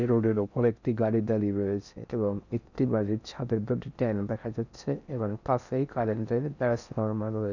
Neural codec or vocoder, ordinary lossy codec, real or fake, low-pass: codec, 16 kHz in and 24 kHz out, 0.6 kbps, FocalCodec, streaming, 4096 codes; none; fake; 7.2 kHz